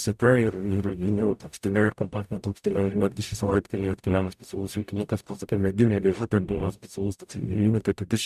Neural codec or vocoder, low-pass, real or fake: codec, 44.1 kHz, 0.9 kbps, DAC; 14.4 kHz; fake